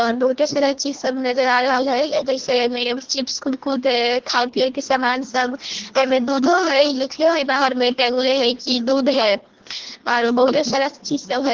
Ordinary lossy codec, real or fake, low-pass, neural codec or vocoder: Opus, 16 kbps; fake; 7.2 kHz; codec, 24 kHz, 1.5 kbps, HILCodec